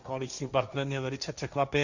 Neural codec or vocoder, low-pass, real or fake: codec, 16 kHz, 1.1 kbps, Voila-Tokenizer; 7.2 kHz; fake